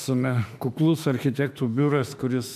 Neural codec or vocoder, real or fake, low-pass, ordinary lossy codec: autoencoder, 48 kHz, 32 numbers a frame, DAC-VAE, trained on Japanese speech; fake; 14.4 kHz; MP3, 96 kbps